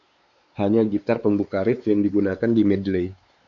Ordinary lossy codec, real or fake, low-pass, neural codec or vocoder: MP3, 64 kbps; fake; 7.2 kHz; codec, 16 kHz, 4 kbps, X-Codec, WavLM features, trained on Multilingual LibriSpeech